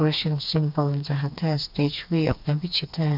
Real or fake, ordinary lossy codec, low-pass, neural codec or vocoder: fake; MP3, 48 kbps; 5.4 kHz; codec, 16 kHz, 2 kbps, FreqCodec, smaller model